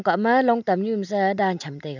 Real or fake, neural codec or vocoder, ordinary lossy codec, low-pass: real; none; none; 7.2 kHz